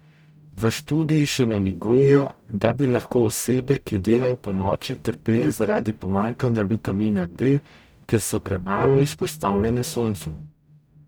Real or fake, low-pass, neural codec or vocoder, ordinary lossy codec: fake; none; codec, 44.1 kHz, 0.9 kbps, DAC; none